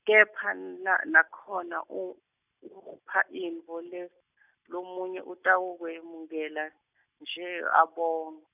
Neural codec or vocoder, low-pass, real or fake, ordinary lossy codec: none; 3.6 kHz; real; none